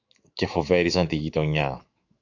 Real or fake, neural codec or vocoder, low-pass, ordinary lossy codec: fake; autoencoder, 48 kHz, 128 numbers a frame, DAC-VAE, trained on Japanese speech; 7.2 kHz; AAC, 48 kbps